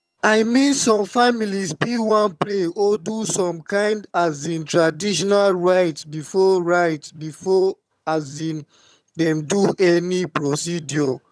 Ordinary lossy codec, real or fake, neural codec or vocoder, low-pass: none; fake; vocoder, 22.05 kHz, 80 mel bands, HiFi-GAN; none